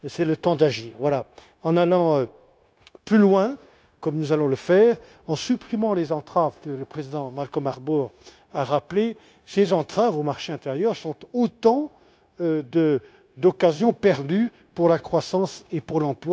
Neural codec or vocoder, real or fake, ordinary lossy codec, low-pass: codec, 16 kHz, 0.9 kbps, LongCat-Audio-Codec; fake; none; none